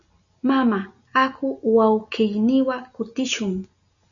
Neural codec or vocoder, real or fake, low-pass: none; real; 7.2 kHz